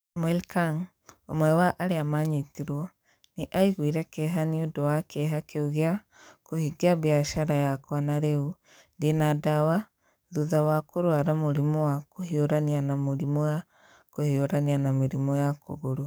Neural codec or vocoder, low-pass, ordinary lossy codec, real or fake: codec, 44.1 kHz, 7.8 kbps, DAC; none; none; fake